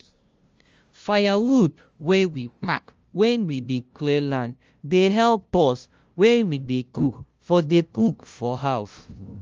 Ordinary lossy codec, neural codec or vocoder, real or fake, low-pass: Opus, 32 kbps; codec, 16 kHz, 0.5 kbps, FunCodec, trained on LibriTTS, 25 frames a second; fake; 7.2 kHz